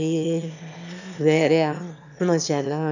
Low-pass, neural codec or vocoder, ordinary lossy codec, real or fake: 7.2 kHz; autoencoder, 22.05 kHz, a latent of 192 numbers a frame, VITS, trained on one speaker; none; fake